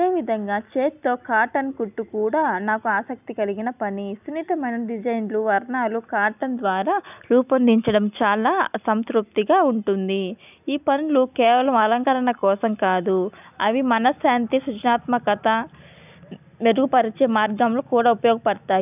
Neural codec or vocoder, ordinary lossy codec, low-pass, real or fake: none; none; 3.6 kHz; real